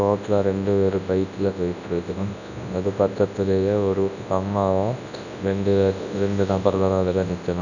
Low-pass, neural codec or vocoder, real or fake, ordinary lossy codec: 7.2 kHz; codec, 24 kHz, 0.9 kbps, WavTokenizer, large speech release; fake; none